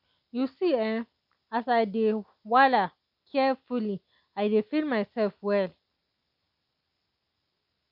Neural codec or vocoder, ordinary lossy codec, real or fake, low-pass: none; none; real; 5.4 kHz